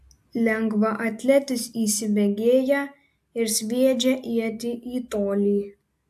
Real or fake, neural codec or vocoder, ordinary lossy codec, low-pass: real; none; AAC, 96 kbps; 14.4 kHz